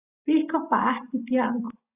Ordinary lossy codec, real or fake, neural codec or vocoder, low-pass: Opus, 64 kbps; real; none; 3.6 kHz